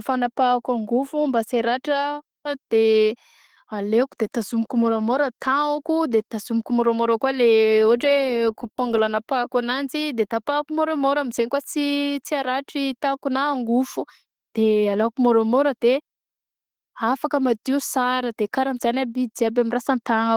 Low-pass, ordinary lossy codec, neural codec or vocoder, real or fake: 19.8 kHz; Opus, 16 kbps; none; real